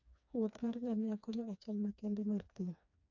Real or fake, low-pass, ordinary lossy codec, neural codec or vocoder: fake; 7.2 kHz; none; codec, 16 kHz, 2 kbps, FreqCodec, smaller model